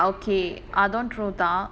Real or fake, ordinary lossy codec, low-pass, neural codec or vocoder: real; none; none; none